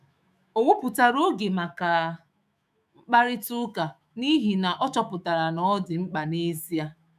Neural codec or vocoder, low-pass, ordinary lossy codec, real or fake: autoencoder, 48 kHz, 128 numbers a frame, DAC-VAE, trained on Japanese speech; 14.4 kHz; none; fake